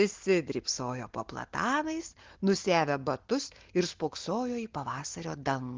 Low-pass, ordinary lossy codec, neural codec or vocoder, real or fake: 7.2 kHz; Opus, 16 kbps; none; real